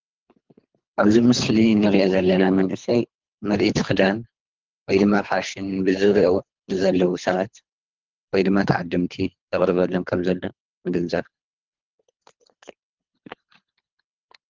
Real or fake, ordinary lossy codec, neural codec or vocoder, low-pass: fake; Opus, 32 kbps; codec, 24 kHz, 3 kbps, HILCodec; 7.2 kHz